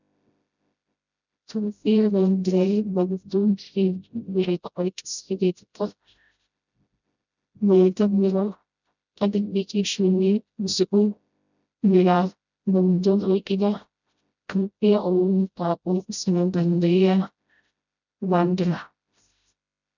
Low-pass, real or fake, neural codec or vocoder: 7.2 kHz; fake; codec, 16 kHz, 0.5 kbps, FreqCodec, smaller model